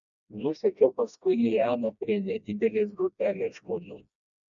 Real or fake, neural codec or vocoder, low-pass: fake; codec, 16 kHz, 1 kbps, FreqCodec, smaller model; 7.2 kHz